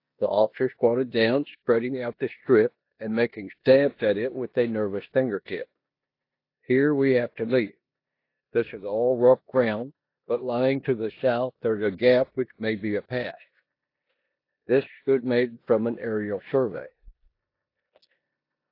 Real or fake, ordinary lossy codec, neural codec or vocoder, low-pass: fake; AAC, 32 kbps; codec, 16 kHz in and 24 kHz out, 0.9 kbps, LongCat-Audio-Codec, four codebook decoder; 5.4 kHz